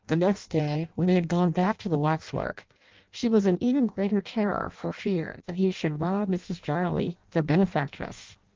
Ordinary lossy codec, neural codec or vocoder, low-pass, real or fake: Opus, 32 kbps; codec, 16 kHz in and 24 kHz out, 0.6 kbps, FireRedTTS-2 codec; 7.2 kHz; fake